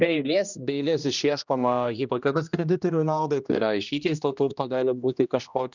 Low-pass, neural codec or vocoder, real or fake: 7.2 kHz; codec, 16 kHz, 1 kbps, X-Codec, HuBERT features, trained on general audio; fake